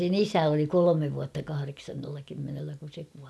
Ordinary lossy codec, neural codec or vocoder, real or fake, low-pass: none; none; real; none